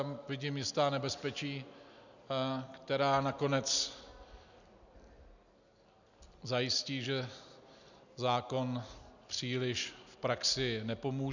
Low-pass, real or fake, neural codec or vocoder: 7.2 kHz; real; none